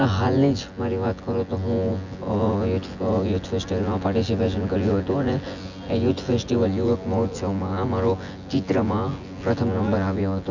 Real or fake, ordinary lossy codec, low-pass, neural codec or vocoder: fake; none; 7.2 kHz; vocoder, 24 kHz, 100 mel bands, Vocos